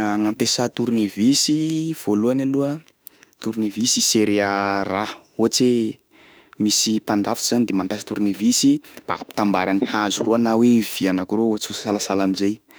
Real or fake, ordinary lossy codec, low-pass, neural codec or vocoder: fake; none; none; autoencoder, 48 kHz, 32 numbers a frame, DAC-VAE, trained on Japanese speech